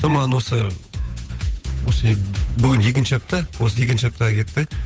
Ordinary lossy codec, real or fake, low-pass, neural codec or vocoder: none; fake; none; codec, 16 kHz, 8 kbps, FunCodec, trained on Chinese and English, 25 frames a second